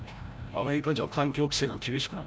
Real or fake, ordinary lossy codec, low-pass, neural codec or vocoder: fake; none; none; codec, 16 kHz, 0.5 kbps, FreqCodec, larger model